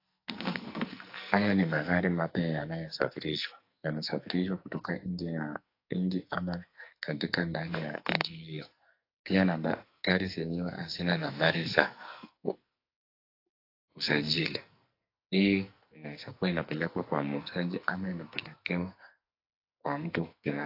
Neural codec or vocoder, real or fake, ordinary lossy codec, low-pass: codec, 44.1 kHz, 2.6 kbps, SNAC; fake; AAC, 32 kbps; 5.4 kHz